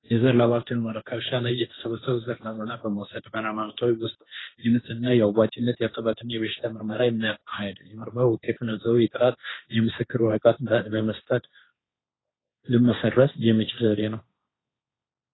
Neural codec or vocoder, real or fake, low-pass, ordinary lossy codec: codec, 16 kHz, 1.1 kbps, Voila-Tokenizer; fake; 7.2 kHz; AAC, 16 kbps